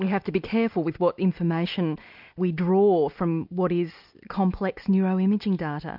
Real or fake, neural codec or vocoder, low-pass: real; none; 5.4 kHz